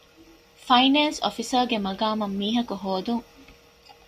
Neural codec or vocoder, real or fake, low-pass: none; real; 14.4 kHz